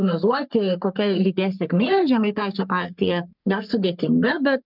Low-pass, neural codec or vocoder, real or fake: 5.4 kHz; codec, 44.1 kHz, 3.4 kbps, Pupu-Codec; fake